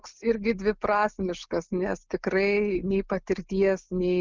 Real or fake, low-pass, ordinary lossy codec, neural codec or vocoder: real; 7.2 kHz; Opus, 24 kbps; none